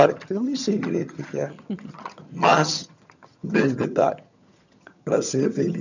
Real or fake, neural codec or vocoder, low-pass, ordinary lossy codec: fake; vocoder, 22.05 kHz, 80 mel bands, HiFi-GAN; 7.2 kHz; none